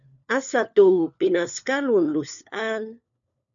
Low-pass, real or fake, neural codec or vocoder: 7.2 kHz; fake; codec, 16 kHz, 4 kbps, FunCodec, trained on LibriTTS, 50 frames a second